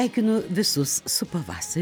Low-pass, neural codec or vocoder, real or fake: 19.8 kHz; none; real